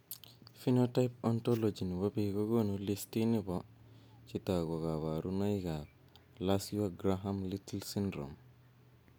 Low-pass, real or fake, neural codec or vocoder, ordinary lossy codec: none; real; none; none